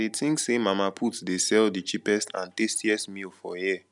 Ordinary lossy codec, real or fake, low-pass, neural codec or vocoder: none; real; 10.8 kHz; none